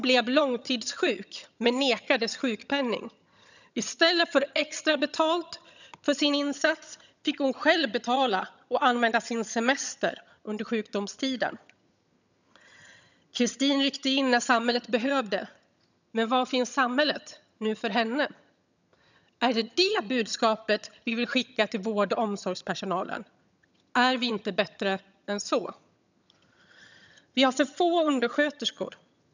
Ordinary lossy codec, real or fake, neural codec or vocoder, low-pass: none; fake; vocoder, 22.05 kHz, 80 mel bands, HiFi-GAN; 7.2 kHz